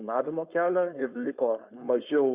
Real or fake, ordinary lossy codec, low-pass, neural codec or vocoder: fake; Opus, 64 kbps; 3.6 kHz; codec, 16 kHz, 4.8 kbps, FACodec